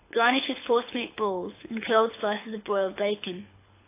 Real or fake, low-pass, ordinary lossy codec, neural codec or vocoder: fake; 3.6 kHz; AAC, 24 kbps; codec, 24 kHz, 6 kbps, HILCodec